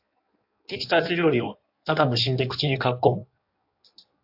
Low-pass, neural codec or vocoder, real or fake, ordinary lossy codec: 5.4 kHz; codec, 16 kHz in and 24 kHz out, 1.1 kbps, FireRedTTS-2 codec; fake; AAC, 48 kbps